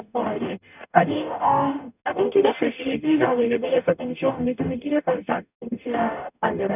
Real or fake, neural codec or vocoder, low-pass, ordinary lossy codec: fake; codec, 44.1 kHz, 0.9 kbps, DAC; 3.6 kHz; none